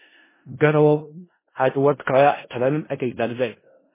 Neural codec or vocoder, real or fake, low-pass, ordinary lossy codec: codec, 16 kHz in and 24 kHz out, 0.4 kbps, LongCat-Audio-Codec, four codebook decoder; fake; 3.6 kHz; MP3, 16 kbps